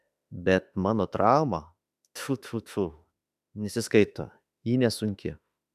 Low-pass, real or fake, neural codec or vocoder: 14.4 kHz; fake; autoencoder, 48 kHz, 32 numbers a frame, DAC-VAE, trained on Japanese speech